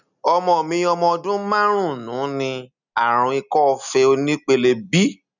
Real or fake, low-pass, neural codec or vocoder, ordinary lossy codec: real; 7.2 kHz; none; none